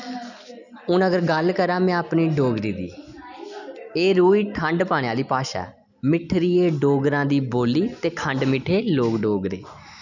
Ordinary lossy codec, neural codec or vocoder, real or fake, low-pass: none; none; real; 7.2 kHz